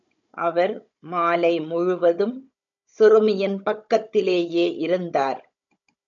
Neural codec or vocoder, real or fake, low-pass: codec, 16 kHz, 16 kbps, FunCodec, trained on Chinese and English, 50 frames a second; fake; 7.2 kHz